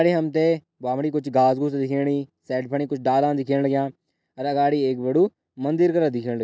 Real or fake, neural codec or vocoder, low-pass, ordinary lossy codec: real; none; none; none